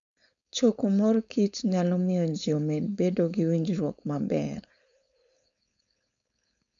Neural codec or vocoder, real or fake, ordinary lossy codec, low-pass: codec, 16 kHz, 4.8 kbps, FACodec; fake; none; 7.2 kHz